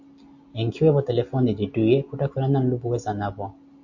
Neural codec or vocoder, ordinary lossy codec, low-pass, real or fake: none; AAC, 48 kbps; 7.2 kHz; real